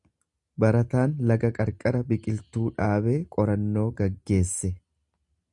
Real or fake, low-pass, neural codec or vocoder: real; 10.8 kHz; none